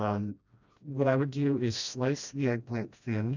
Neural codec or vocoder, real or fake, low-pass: codec, 16 kHz, 1 kbps, FreqCodec, smaller model; fake; 7.2 kHz